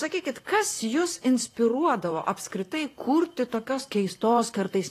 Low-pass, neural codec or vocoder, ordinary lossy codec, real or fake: 14.4 kHz; vocoder, 44.1 kHz, 128 mel bands, Pupu-Vocoder; AAC, 48 kbps; fake